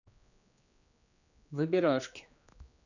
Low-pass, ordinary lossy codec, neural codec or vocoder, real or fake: 7.2 kHz; none; codec, 16 kHz, 2 kbps, X-Codec, HuBERT features, trained on general audio; fake